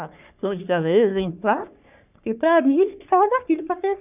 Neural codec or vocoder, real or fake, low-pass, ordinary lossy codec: codec, 44.1 kHz, 3.4 kbps, Pupu-Codec; fake; 3.6 kHz; none